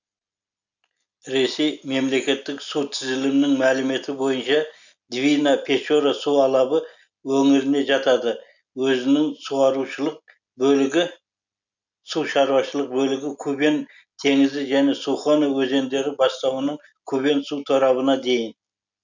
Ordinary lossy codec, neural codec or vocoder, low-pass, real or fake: none; none; 7.2 kHz; real